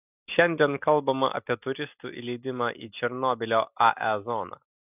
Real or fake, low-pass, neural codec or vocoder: real; 3.6 kHz; none